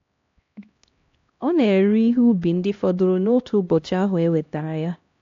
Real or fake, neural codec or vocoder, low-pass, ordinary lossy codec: fake; codec, 16 kHz, 1 kbps, X-Codec, HuBERT features, trained on LibriSpeech; 7.2 kHz; AAC, 48 kbps